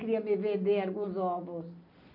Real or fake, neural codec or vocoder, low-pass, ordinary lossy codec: real; none; 5.4 kHz; none